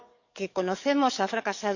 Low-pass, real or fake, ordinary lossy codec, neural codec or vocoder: 7.2 kHz; fake; none; codec, 44.1 kHz, 7.8 kbps, DAC